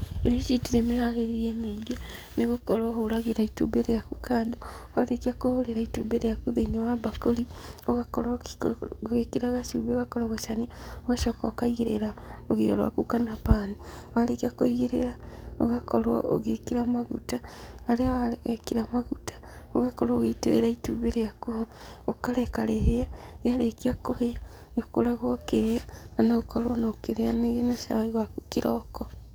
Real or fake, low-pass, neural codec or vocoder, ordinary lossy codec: fake; none; codec, 44.1 kHz, 7.8 kbps, DAC; none